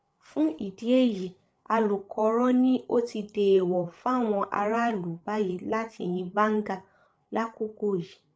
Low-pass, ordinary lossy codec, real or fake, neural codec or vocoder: none; none; fake; codec, 16 kHz, 8 kbps, FreqCodec, larger model